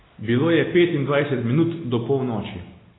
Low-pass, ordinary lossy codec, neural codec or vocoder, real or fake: 7.2 kHz; AAC, 16 kbps; none; real